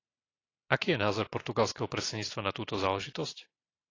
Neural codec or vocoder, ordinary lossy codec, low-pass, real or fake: none; AAC, 32 kbps; 7.2 kHz; real